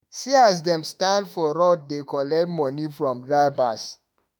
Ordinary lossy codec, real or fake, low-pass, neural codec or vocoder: none; fake; none; autoencoder, 48 kHz, 32 numbers a frame, DAC-VAE, trained on Japanese speech